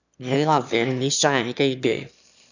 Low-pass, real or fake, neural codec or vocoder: 7.2 kHz; fake; autoencoder, 22.05 kHz, a latent of 192 numbers a frame, VITS, trained on one speaker